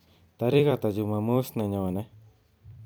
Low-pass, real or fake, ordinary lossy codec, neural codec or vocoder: none; fake; none; vocoder, 44.1 kHz, 128 mel bands every 256 samples, BigVGAN v2